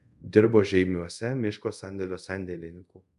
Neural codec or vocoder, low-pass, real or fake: codec, 24 kHz, 0.5 kbps, DualCodec; 10.8 kHz; fake